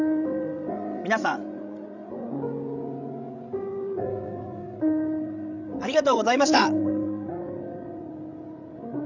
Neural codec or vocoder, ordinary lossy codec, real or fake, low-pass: codec, 16 kHz, 16 kbps, FreqCodec, larger model; none; fake; 7.2 kHz